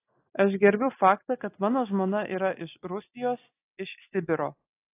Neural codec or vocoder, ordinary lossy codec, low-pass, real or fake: none; AAC, 24 kbps; 3.6 kHz; real